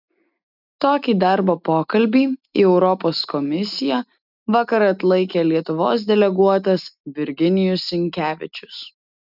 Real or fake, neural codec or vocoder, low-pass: real; none; 5.4 kHz